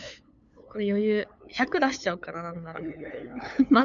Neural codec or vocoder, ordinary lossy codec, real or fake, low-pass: codec, 16 kHz, 8 kbps, FunCodec, trained on LibriTTS, 25 frames a second; MP3, 96 kbps; fake; 7.2 kHz